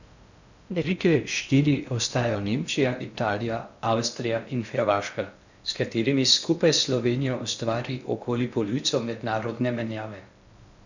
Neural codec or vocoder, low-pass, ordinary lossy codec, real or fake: codec, 16 kHz in and 24 kHz out, 0.6 kbps, FocalCodec, streaming, 2048 codes; 7.2 kHz; none; fake